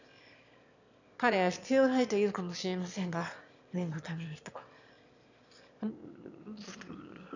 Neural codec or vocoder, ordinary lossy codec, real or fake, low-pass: autoencoder, 22.05 kHz, a latent of 192 numbers a frame, VITS, trained on one speaker; none; fake; 7.2 kHz